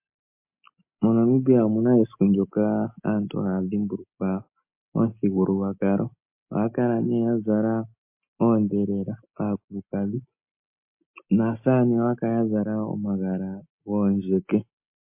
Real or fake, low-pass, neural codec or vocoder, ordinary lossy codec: real; 3.6 kHz; none; MP3, 32 kbps